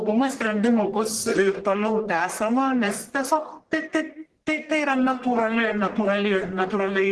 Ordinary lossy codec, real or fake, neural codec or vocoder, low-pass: Opus, 16 kbps; fake; codec, 44.1 kHz, 1.7 kbps, Pupu-Codec; 10.8 kHz